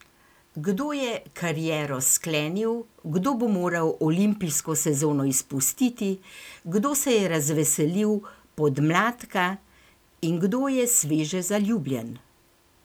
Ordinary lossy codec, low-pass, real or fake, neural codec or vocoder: none; none; real; none